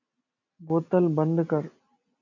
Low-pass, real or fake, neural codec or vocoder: 7.2 kHz; real; none